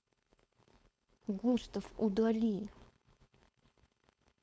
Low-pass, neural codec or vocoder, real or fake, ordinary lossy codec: none; codec, 16 kHz, 4.8 kbps, FACodec; fake; none